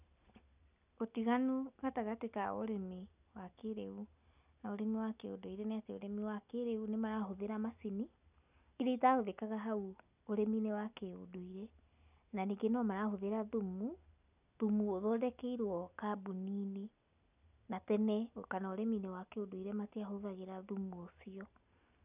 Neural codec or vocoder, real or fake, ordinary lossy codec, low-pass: none; real; none; 3.6 kHz